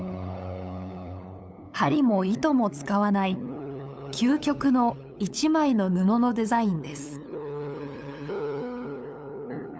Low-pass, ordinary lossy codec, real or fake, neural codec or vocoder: none; none; fake; codec, 16 kHz, 8 kbps, FunCodec, trained on LibriTTS, 25 frames a second